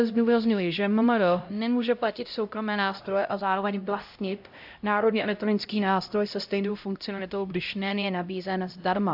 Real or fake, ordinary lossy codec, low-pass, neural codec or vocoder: fake; AAC, 48 kbps; 5.4 kHz; codec, 16 kHz, 0.5 kbps, X-Codec, HuBERT features, trained on LibriSpeech